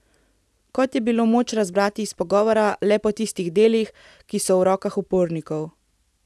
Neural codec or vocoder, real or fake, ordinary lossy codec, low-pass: none; real; none; none